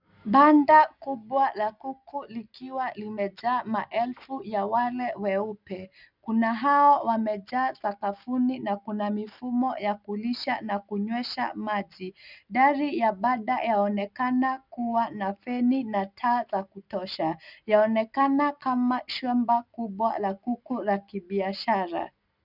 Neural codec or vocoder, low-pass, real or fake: none; 5.4 kHz; real